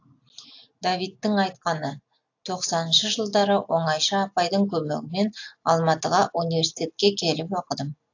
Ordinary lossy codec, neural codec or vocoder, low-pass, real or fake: AAC, 48 kbps; none; 7.2 kHz; real